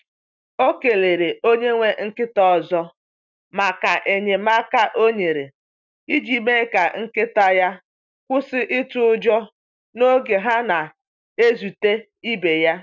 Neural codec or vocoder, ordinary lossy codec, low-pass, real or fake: none; none; 7.2 kHz; real